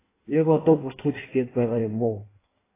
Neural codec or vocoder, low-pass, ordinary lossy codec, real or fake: codec, 16 kHz in and 24 kHz out, 1.1 kbps, FireRedTTS-2 codec; 3.6 kHz; AAC, 16 kbps; fake